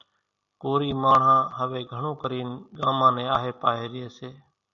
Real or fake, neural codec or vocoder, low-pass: real; none; 7.2 kHz